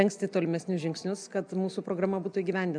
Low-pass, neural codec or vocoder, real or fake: 9.9 kHz; none; real